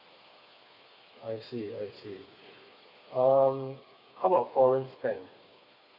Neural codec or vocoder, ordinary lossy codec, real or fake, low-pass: codec, 16 kHz, 4 kbps, FreqCodec, smaller model; none; fake; 5.4 kHz